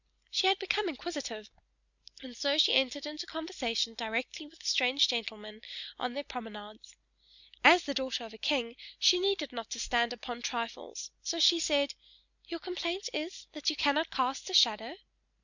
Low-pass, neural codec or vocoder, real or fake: 7.2 kHz; none; real